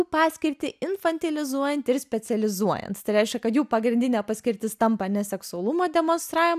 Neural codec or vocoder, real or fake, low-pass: none; real; 14.4 kHz